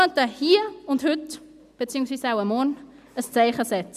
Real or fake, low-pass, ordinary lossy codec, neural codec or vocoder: real; 14.4 kHz; none; none